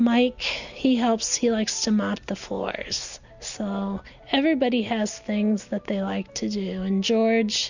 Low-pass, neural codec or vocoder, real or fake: 7.2 kHz; none; real